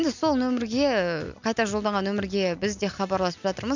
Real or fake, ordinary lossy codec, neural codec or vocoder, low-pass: real; none; none; 7.2 kHz